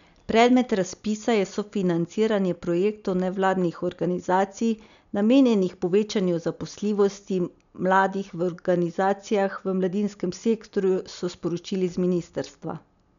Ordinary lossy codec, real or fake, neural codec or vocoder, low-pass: none; real; none; 7.2 kHz